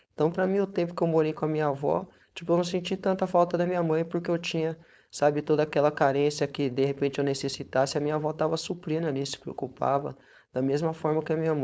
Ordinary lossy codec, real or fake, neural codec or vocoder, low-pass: none; fake; codec, 16 kHz, 4.8 kbps, FACodec; none